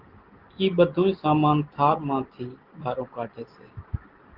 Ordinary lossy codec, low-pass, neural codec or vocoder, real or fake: Opus, 16 kbps; 5.4 kHz; none; real